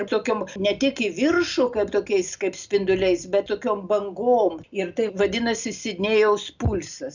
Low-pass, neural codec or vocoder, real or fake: 7.2 kHz; none; real